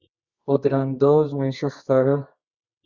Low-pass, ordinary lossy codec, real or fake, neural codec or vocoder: 7.2 kHz; Opus, 64 kbps; fake; codec, 24 kHz, 0.9 kbps, WavTokenizer, medium music audio release